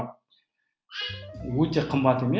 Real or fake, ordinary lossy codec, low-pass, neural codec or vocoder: real; none; none; none